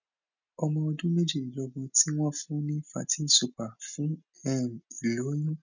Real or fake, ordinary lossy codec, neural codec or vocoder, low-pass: real; none; none; 7.2 kHz